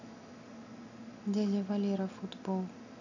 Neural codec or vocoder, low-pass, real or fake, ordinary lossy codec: none; 7.2 kHz; real; none